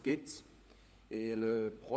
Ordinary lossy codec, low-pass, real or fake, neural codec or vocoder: none; none; fake; codec, 16 kHz, 4 kbps, FunCodec, trained on LibriTTS, 50 frames a second